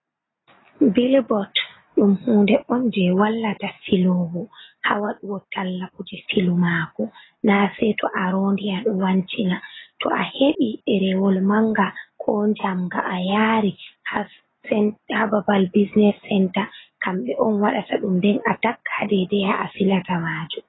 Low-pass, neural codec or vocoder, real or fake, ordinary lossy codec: 7.2 kHz; none; real; AAC, 16 kbps